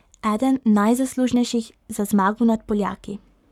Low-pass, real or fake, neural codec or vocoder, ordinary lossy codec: 19.8 kHz; fake; codec, 44.1 kHz, 7.8 kbps, Pupu-Codec; none